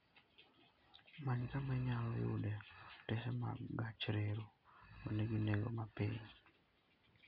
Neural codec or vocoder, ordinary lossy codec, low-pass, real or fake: none; none; 5.4 kHz; real